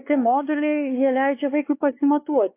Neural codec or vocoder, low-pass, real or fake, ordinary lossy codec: codec, 16 kHz, 2 kbps, X-Codec, WavLM features, trained on Multilingual LibriSpeech; 3.6 kHz; fake; AAC, 24 kbps